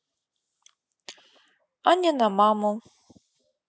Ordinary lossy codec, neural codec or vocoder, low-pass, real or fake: none; none; none; real